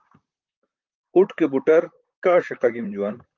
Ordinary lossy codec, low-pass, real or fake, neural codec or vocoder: Opus, 32 kbps; 7.2 kHz; fake; vocoder, 44.1 kHz, 128 mel bands, Pupu-Vocoder